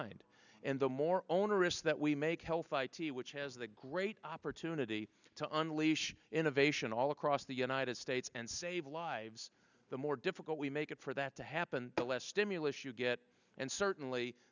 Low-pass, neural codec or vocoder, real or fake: 7.2 kHz; none; real